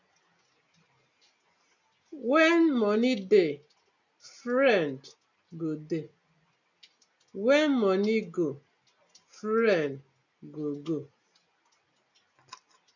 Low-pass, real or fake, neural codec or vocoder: 7.2 kHz; real; none